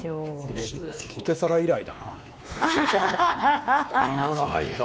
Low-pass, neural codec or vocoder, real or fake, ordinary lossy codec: none; codec, 16 kHz, 2 kbps, X-Codec, WavLM features, trained on Multilingual LibriSpeech; fake; none